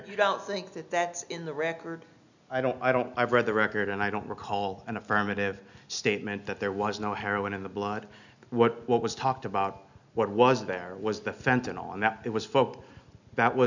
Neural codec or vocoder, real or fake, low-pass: none; real; 7.2 kHz